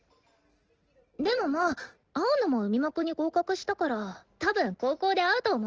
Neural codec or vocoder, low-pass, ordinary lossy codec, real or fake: none; 7.2 kHz; Opus, 16 kbps; real